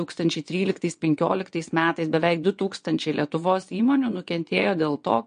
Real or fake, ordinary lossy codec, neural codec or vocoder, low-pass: fake; MP3, 48 kbps; vocoder, 22.05 kHz, 80 mel bands, WaveNeXt; 9.9 kHz